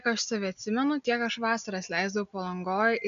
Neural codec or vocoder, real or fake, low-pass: none; real; 7.2 kHz